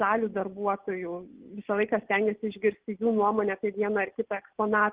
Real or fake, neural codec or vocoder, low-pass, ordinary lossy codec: real; none; 3.6 kHz; Opus, 16 kbps